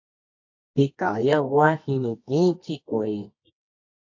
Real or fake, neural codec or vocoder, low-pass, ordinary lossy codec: fake; codec, 24 kHz, 0.9 kbps, WavTokenizer, medium music audio release; 7.2 kHz; AAC, 48 kbps